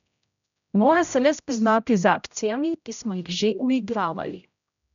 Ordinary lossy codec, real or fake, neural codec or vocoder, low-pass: none; fake; codec, 16 kHz, 0.5 kbps, X-Codec, HuBERT features, trained on general audio; 7.2 kHz